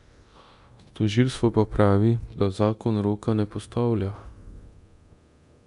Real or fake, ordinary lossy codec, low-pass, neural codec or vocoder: fake; none; 10.8 kHz; codec, 24 kHz, 0.9 kbps, DualCodec